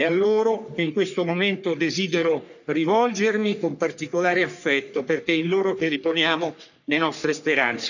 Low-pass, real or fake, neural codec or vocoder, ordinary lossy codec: 7.2 kHz; fake; codec, 44.1 kHz, 3.4 kbps, Pupu-Codec; none